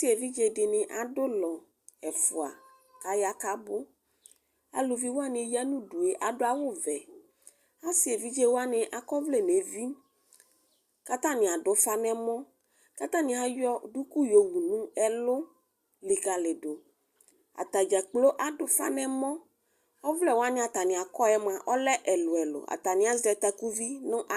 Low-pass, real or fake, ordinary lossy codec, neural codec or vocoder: 14.4 kHz; real; Opus, 64 kbps; none